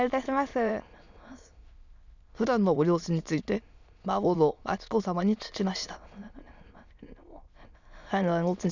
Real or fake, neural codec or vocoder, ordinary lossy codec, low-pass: fake; autoencoder, 22.05 kHz, a latent of 192 numbers a frame, VITS, trained on many speakers; none; 7.2 kHz